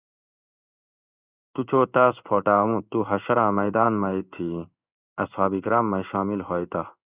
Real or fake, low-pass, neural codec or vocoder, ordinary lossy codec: fake; 3.6 kHz; codec, 16 kHz in and 24 kHz out, 1 kbps, XY-Tokenizer; Opus, 64 kbps